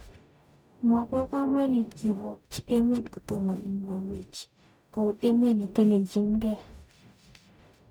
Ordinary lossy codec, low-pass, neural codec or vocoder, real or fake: none; none; codec, 44.1 kHz, 0.9 kbps, DAC; fake